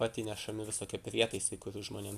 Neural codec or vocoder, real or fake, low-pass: none; real; 14.4 kHz